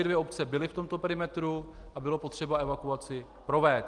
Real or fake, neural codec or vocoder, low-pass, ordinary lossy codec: real; none; 10.8 kHz; Opus, 24 kbps